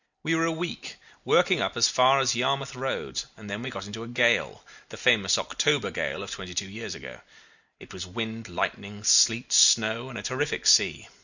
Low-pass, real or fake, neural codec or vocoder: 7.2 kHz; real; none